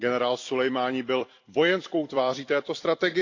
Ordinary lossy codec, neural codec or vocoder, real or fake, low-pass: AAC, 48 kbps; vocoder, 44.1 kHz, 128 mel bands every 256 samples, BigVGAN v2; fake; 7.2 kHz